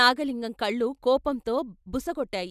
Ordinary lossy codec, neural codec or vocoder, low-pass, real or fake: none; none; 14.4 kHz; real